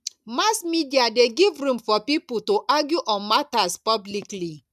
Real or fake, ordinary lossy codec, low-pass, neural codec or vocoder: real; none; 14.4 kHz; none